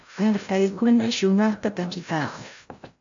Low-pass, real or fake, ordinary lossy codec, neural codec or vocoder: 7.2 kHz; fake; AAC, 64 kbps; codec, 16 kHz, 0.5 kbps, FreqCodec, larger model